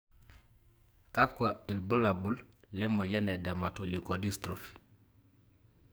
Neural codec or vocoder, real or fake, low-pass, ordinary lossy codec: codec, 44.1 kHz, 2.6 kbps, SNAC; fake; none; none